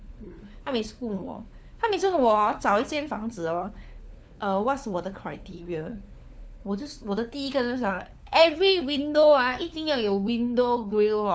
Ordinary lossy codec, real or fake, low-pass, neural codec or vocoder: none; fake; none; codec, 16 kHz, 4 kbps, FunCodec, trained on LibriTTS, 50 frames a second